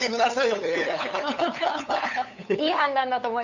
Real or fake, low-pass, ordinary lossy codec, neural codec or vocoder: fake; 7.2 kHz; none; codec, 16 kHz, 8 kbps, FunCodec, trained on LibriTTS, 25 frames a second